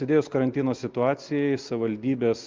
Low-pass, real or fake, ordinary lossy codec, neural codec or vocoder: 7.2 kHz; real; Opus, 32 kbps; none